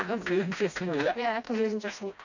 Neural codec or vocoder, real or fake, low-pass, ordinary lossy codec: codec, 16 kHz, 1 kbps, FreqCodec, smaller model; fake; 7.2 kHz; none